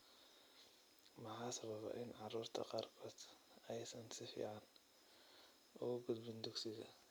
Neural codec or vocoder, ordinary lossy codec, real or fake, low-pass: none; none; real; none